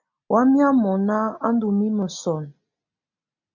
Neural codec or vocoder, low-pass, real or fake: none; 7.2 kHz; real